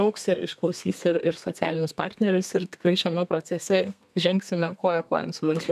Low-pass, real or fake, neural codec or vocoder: 14.4 kHz; fake; codec, 32 kHz, 1.9 kbps, SNAC